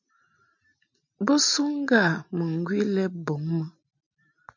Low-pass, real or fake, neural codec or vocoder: 7.2 kHz; real; none